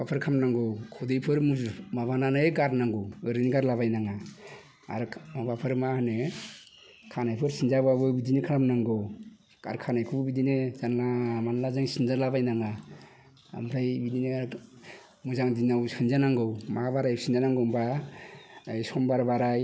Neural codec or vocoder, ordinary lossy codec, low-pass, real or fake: none; none; none; real